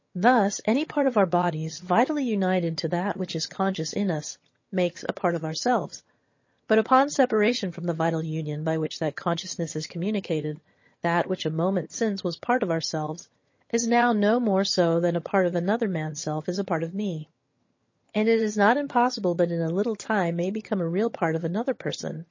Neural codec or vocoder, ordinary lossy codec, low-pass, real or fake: vocoder, 22.05 kHz, 80 mel bands, HiFi-GAN; MP3, 32 kbps; 7.2 kHz; fake